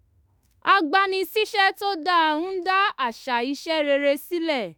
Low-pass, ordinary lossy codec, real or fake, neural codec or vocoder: none; none; fake; autoencoder, 48 kHz, 32 numbers a frame, DAC-VAE, trained on Japanese speech